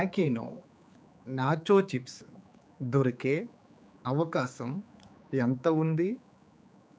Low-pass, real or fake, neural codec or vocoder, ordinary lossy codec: none; fake; codec, 16 kHz, 4 kbps, X-Codec, HuBERT features, trained on general audio; none